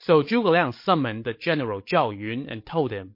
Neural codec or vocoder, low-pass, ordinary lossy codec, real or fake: codec, 16 kHz, 16 kbps, FreqCodec, larger model; 5.4 kHz; MP3, 32 kbps; fake